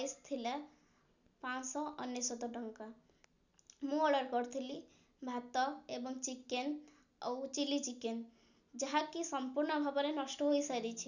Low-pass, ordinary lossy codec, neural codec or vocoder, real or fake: 7.2 kHz; none; none; real